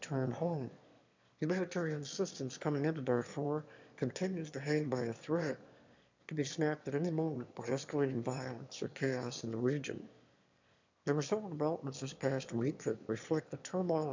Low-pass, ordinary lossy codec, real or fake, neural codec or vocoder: 7.2 kHz; MP3, 64 kbps; fake; autoencoder, 22.05 kHz, a latent of 192 numbers a frame, VITS, trained on one speaker